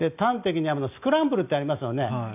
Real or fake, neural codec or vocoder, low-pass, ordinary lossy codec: real; none; 3.6 kHz; none